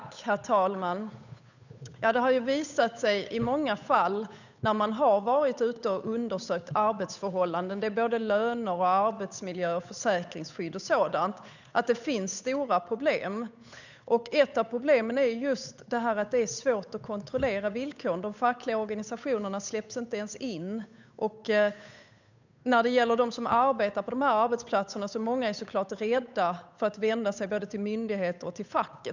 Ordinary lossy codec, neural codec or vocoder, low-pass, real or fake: none; codec, 16 kHz, 8 kbps, FunCodec, trained on Chinese and English, 25 frames a second; 7.2 kHz; fake